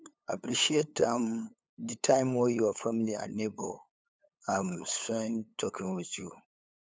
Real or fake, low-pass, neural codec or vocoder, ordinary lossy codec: fake; none; codec, 16 kHz, 8 kbps, FunCodec, trained on LibriTTS, 25 frames a second; none